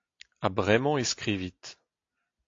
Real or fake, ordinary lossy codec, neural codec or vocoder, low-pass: real; AAC, 48 kbps; none; 7.2 kHz